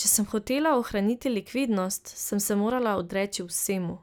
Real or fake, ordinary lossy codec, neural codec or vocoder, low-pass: real; none; none; none